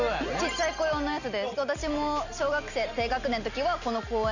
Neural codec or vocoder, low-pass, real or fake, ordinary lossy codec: none; 7.2 kHz; real; none